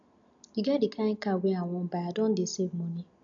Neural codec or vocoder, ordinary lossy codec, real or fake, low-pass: none; none; real; 7.2 kHz